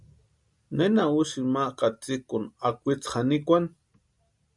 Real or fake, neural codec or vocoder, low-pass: real; none; 10.8 kHz